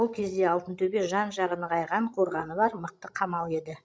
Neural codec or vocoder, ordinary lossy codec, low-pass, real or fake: codec, 16 kHz, 16 kbps, FreqCodec, larger model; none; none; fake